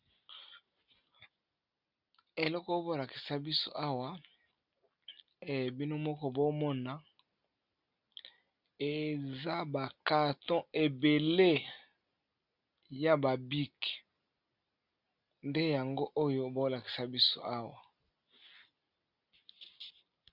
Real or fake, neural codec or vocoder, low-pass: real; none; 5.4 kHz